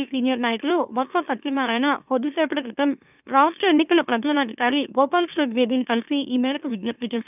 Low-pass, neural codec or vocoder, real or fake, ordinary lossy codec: 3.6 kHz; autoencoder, 44.1 kHz, a latent of 192 numbers a frame, MeloTTS; fake; none